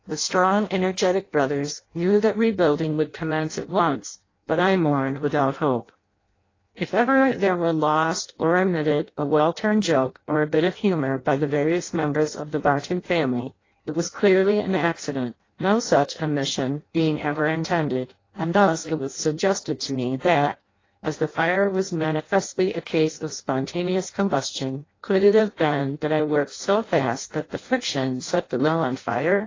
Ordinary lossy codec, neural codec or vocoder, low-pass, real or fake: AAC, 32 kbps; codec, 16 kHz in and 24 kHz out, 0.6 kbps, FireRedTTS-2 codec; 7.2 kHz; fake